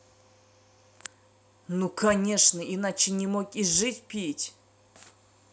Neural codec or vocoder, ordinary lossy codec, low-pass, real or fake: none; none; none; real